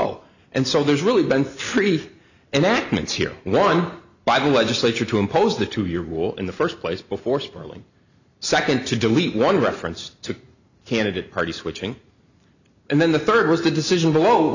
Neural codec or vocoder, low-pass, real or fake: none; 7.2 kHz; real